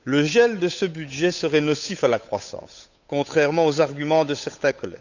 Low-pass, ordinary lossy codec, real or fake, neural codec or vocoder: 7.2 kHz; none; fake; codec, 16 kHz, 8 kbps, FunCodec, trained on Chinese and English, 25 frames a second